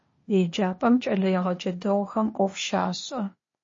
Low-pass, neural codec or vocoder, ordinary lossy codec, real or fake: 7.2 kHz; codec, 16 kHz, 0.8 kbps, ZipCodec; MP3, 32 kbps; fake